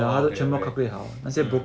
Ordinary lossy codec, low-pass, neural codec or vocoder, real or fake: none; none; none; real